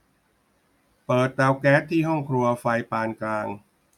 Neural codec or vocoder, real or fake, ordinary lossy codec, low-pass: none; real; none; 19.8 kHz